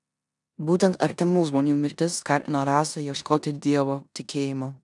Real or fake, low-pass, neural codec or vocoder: fake; 10.8 kHz; codec, 16 kHz in and 24 kHz out, 0.9 kbps, LongCat-Audio-Codec, four codebook decoder